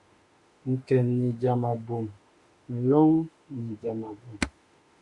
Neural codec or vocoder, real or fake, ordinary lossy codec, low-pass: autoencoder, 48 kHz, 32 numbers a frame, DAC-VAE, trained on Japanese speech; fake; Opus, 64 kbps; 10.8 kHz